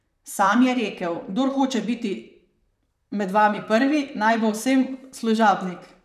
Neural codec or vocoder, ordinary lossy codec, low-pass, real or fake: vocoder, 44.1 kHz, 128 mel bands, Pupu-Vocoder; none; 14.4 kHz; fake